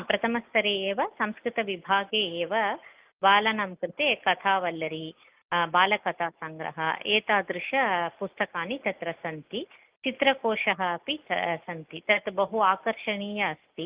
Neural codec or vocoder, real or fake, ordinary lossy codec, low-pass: none; real; Opus, 24 kbps; 3.6 kHz